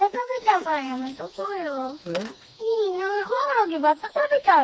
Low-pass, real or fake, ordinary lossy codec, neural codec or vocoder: none; fake; none; codec, 16 kHz, 2 kbps, FreqCodec, smaller model